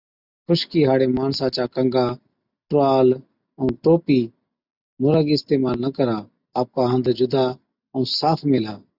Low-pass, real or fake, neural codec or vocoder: 5.4 kHz; real; none